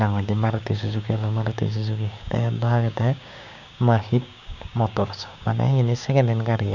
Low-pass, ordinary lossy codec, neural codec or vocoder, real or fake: 7.2 kHz; none; codec, 16 kHz, 6 kbps, DAC; fake